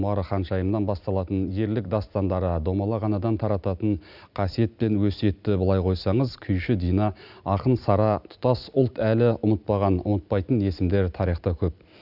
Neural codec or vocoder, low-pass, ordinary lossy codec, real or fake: none; 5.4 kHz; none; real